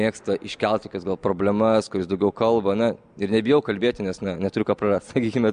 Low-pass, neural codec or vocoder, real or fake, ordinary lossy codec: 9.9 kHz; none; real; MP3, 64 kbps